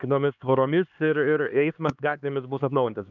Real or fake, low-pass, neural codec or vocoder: fake; 7.2 kHz; codec, 16 kHz, 2 kbps, X-Codec, HuBERT features, trained on LibriSpeech